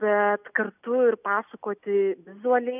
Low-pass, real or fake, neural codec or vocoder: 3.6 kHz; real; none